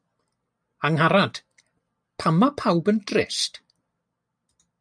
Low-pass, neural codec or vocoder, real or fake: 9.9 kHz; none; real